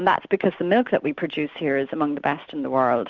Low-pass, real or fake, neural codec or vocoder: 7.2 kHz; real; none